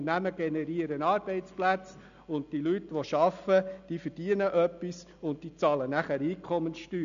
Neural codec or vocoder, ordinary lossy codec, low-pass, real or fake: none; none; 7.2 kHz; real